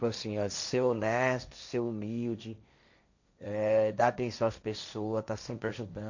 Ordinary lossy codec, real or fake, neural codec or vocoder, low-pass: none; fake; codec, 16 kHz, 1.1 kbps, Voila-Tokenizer; 7.2 kHz